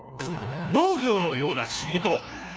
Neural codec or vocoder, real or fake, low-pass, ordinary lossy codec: codec, 16 kHz, 1 kbps, FunCodec, trained on LibriTTS, 50 frames a second; fake; none; none